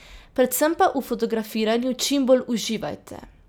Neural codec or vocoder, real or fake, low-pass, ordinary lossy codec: none; real; none; none